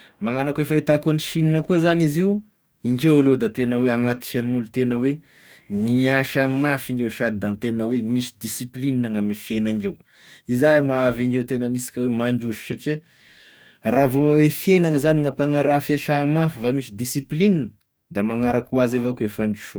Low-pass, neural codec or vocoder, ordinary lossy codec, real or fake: none; codec, 44.1 kHz, 2.6 kbps, DAC; none; fake